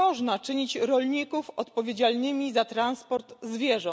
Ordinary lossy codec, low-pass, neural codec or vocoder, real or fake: none; none; none; real